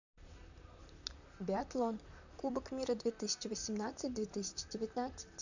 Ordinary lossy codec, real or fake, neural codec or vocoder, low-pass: none; fake; vocoder, 44.1 kHz, 128 mel bands, Pupu-Vocoder; 7.2 kHz